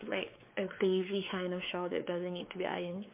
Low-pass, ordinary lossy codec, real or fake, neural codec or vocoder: 3.6 kHz; MP3, 24 kbps; fake; codec, 16 kHz, 4 kbps, X-Codec, WavLM features, trained on Multilingual LibriSpeech